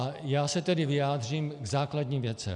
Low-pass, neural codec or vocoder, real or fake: 10.8 kHz; none; real